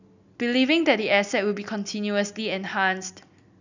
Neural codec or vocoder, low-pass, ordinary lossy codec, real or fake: none; 7.2 kHz; none; real